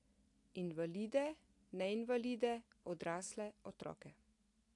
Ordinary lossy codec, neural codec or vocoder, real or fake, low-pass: AAC, 48 kbps; none; real; 10.8 kHz